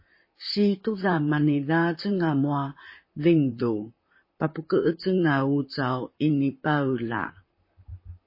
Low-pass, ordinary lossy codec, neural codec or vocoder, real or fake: 5.4 kHz; MP3, 24 kbps; vocoder, 44.1 kHz, 128 mel bands, Pupu-Vocoder; fake